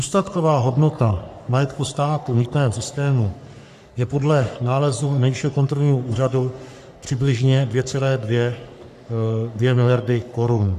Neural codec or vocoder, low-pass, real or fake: codec, 44.1 kHz, 3.4 kbps, Pupu-Codec; 14.4 kHz; fake